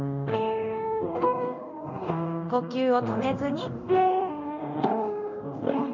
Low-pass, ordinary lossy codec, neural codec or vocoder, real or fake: 7.2 kHz; none; codec, 24 kHz, 0.9 kbps, DualCodec; fake